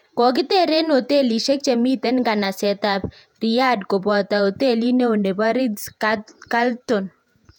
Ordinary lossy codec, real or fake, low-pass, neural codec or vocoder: none; fake; 19.8 kHz; vocoder, 48 kHz, 128 mel bands, Vocos